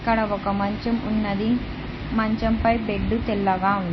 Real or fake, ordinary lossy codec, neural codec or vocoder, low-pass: real; MP3, 24 kbps; none; 7.2 kHz